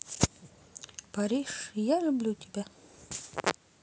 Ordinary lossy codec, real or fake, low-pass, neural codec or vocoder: none; real; none; none